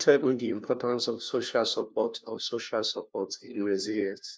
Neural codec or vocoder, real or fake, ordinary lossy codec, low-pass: codec, 16 kHz, 1 kbps, FunCodec, trained on LibriTTS, 50 frames a second; fake; none; none